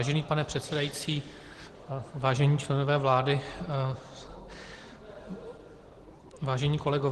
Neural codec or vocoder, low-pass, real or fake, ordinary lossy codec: none; 10.8 kHz; real; Opus, 16 kbps